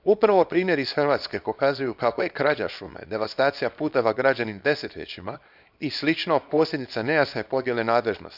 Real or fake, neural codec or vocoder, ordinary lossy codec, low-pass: fake; codec, 24 kHz, 0.9 kbps, WavTokenizer, small release; none; 5.4 kHz